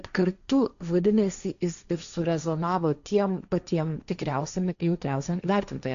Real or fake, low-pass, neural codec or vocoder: fake; 7.2 kHz; codec, 16 kHz, 1.1 kbps, Voila-Tokenizer